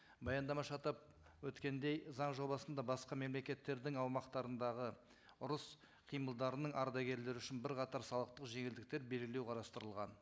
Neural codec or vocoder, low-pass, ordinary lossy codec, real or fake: none; none; none; real